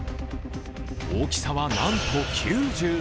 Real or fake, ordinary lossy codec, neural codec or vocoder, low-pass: real; none; none; none